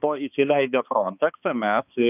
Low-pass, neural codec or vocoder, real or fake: 3.6 kHz; codec, 16 kHz, 2 kbps, X-Codec, HuBERT features, trained on balanced general audio; fake